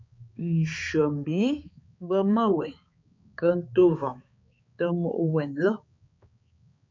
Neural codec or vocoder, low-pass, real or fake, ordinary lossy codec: codec, 16 kHz, 4 kbps, X-Codec, HuBERT features, trained on balanced general audio; 7.2 kHz; fake; MP3, 48 kbps